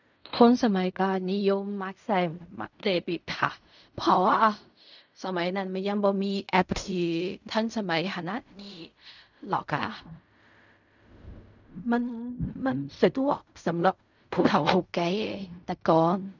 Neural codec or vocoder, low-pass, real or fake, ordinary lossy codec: codec, 16 kHz in and 24 kHz out, 0.4 kbps, LongCat-Audio-Codec, fine tuned four codebook decoder; 7.2 kHz; fake; none